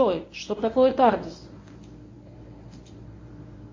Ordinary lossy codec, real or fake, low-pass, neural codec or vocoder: MP3, 32 kbps; fake; 7.2 kHz; codec, 24 kHz, 0.9 kbps, WavTokenizer, medium speech release version 1